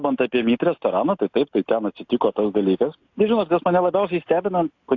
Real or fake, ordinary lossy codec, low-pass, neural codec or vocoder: real; AAC, 48 kbps; 7.2 kHz; none